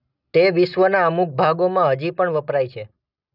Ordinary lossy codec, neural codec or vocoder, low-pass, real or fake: none; none; 5.4 kHz; real